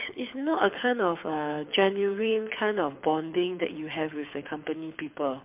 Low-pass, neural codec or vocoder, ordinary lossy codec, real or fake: 3.6 kHz; codec, 24 kHz, 6 kbps, HILCodec; MP3, 32 kbps; fake